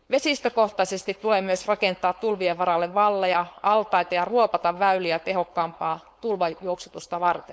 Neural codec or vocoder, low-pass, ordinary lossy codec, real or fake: codec, 16 kHz, 4.8 kbps, FACodec; none; none; fake